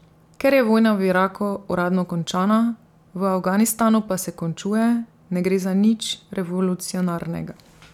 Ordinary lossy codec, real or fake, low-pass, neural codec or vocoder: none; real; 19.8 kHz; none